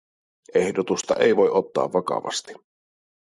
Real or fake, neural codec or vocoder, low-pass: fake; vocoder, 44.1 kHz, 128 mel bands every 256 samples, BigVGAN v2; 10.8 kHz